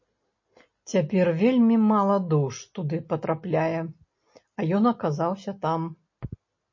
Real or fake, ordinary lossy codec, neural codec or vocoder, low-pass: real; MP3, 32 kbps; none; 7.2 kHz